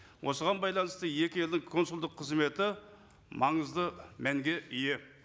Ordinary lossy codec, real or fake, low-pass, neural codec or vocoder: none; real; none; none